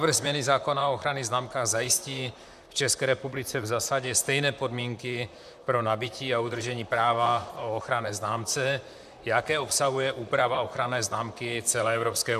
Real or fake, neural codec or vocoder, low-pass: fake; vocoder, 44.1 kHz, 128 mel bands, Pupu-Vocoder; 14.4 kHz